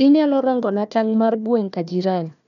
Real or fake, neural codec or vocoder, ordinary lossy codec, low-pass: fake; codec, 16 kHz, 1 kbps, FunCodec, trained on Chinese and English, 50 frames a second; none; 7.2 kHz